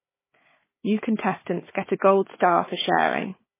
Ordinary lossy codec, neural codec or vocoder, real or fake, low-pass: MP3, 16 kbps; codec, 16 kHz, 4 kbps, FunCodec, trained on Chinese and English, 50 frames a second; fake; 3.6 kHz